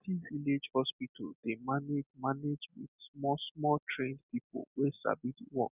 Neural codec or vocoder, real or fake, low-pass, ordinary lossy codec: none; real; 3.6 kHz; none